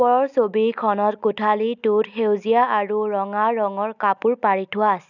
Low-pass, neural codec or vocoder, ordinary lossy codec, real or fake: 7.2 kHz; none; none; real